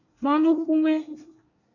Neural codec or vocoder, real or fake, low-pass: codec, 24 kHz, 1 kbps, SNAC; fake; 7.2 kHz